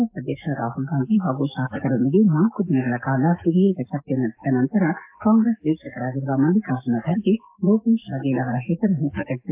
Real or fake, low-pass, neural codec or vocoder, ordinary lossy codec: fake; 3.6 kHz; codec, 44.1 kHz, 7.8 kbps, DAC; AAC, 32 kbps